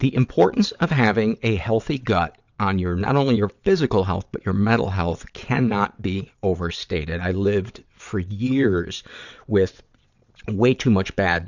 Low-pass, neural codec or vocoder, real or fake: 7.2 kHz; vocoder, 22.05 kHz, 80 mel bands, WaveNeXt; fake